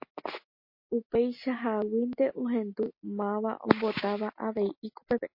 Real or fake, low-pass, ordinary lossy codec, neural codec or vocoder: real; 5.4 kHz; MP3, 32 kbps; none